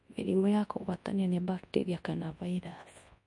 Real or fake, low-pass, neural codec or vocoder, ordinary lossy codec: fake; 10.8 kHz; codec, 24 kHz, 0.9 kbps, WavTokenizer, large speech release; MP3, 48 kbps